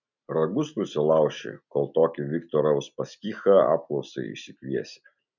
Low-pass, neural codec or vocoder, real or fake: 7.2 kHz; none; real